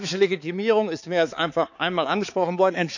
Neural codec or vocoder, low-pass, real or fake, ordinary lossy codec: codec, 16 kHz, 4 kbps, X-Codec, HuBERT features, trained on balanced general audio; 7.2 kHz; fake; none